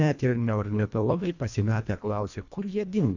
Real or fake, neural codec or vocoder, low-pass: fake; codec, 24 kHz, 1.5 kbps, HILCodec; 7.2 kHz